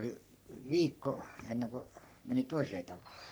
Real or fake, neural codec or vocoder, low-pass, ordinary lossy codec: fake; codec, 44.1 kHz, 3.4 kbps, Pupu-Codec; none; none